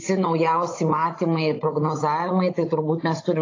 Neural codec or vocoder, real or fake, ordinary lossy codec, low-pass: codec, 16 kHz, 16 kbps, FunCodec, trained on Chinese and English, 50 frames a second; fake; AAC, 32 kbps; 7.2 kHz